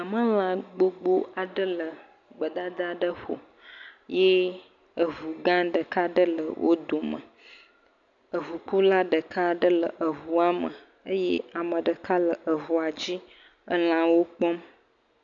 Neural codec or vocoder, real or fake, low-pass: none; real; 7.2 kHz